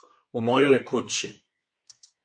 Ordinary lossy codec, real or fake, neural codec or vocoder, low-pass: MP3, 48 kbps; fake; codec, 24 kHz, 1 kbps, SNAC; 9.9 kHz